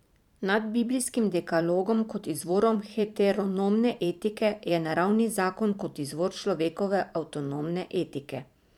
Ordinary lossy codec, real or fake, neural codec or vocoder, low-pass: none; fake; vocoder, 44.1 kHz, 128 mel bands every 512 samples, BigVGAN v2; 19.8 kHz